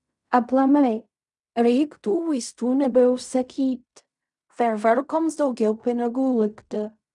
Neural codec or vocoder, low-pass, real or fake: codec, 16 kHz in and 24 kHz out, 0.4 kbps, LongCat-Audio-Codec, fine tuned four codebook decoder; 10.8 kHz; fake